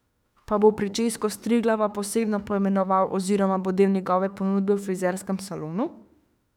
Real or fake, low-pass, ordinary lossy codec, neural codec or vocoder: fake; 19.8 kHz; none; autoencoder, 48 kHz, 32 numbers a frame, DAC-VAE, trained on Japanese speech